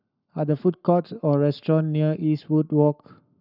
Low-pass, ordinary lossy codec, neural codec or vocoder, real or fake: 5.4 kHz; none; codec, 44.1 kHz, 7.8 kbps, Pupu-Codec; fake